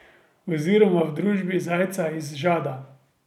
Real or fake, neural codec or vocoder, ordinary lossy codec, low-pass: real; none; none; 19.8 kHz